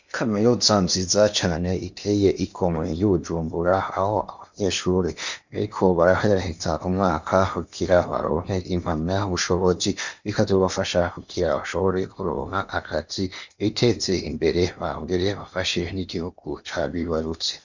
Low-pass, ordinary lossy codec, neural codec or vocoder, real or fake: 7.2 kHz; Opus, 64 kbps; codec, 16 kHz in and 24 kHz out, 0.8 kbps, FocalCodec, streaming, 65536 codes; fake